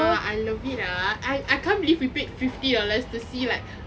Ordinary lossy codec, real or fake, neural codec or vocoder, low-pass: none; real; none; none